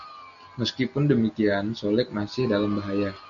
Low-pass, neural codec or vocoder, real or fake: 7.2 kHz; none; real